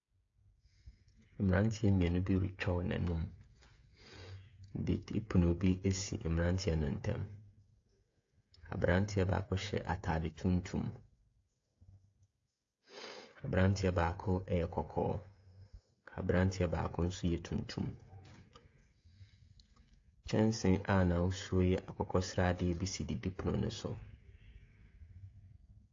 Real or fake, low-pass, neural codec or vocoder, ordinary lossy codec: fake; 7.2 kHz; codec, 16 kHz, 16 kbps, FreqCodec, smaller model; AAC, 48 kbps